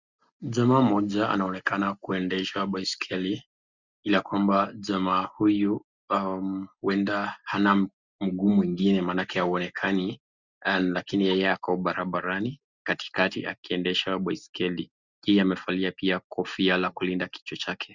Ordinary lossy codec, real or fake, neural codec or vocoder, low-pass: Opus, 64 kbps; real; none; 7.2 kHz